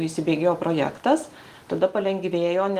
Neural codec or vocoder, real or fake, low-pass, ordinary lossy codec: none; real; 14.4 kHz; Opus, 24 kbps